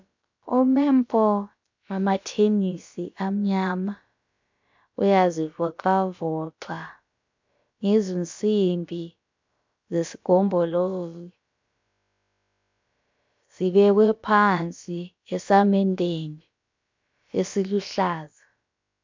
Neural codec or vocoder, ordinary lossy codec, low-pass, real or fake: codec, 16 kHz, about 1 kbps, DyCAST, with the encoder's durations; MP3, 64 kbps; 7.2 kHz; fake